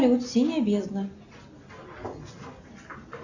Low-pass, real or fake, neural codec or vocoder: 7.2 kHz; real; none